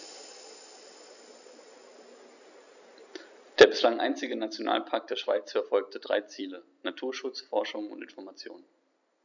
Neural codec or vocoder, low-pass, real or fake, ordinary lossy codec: none; 7.2 kHz; real; none